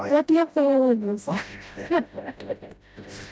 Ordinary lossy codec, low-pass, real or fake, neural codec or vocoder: none; none; fake; codec, 16 kHz, 0.5 kbps, FreqCodec, smaller model